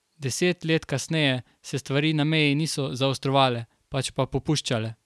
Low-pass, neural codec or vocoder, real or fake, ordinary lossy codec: none; none; real; none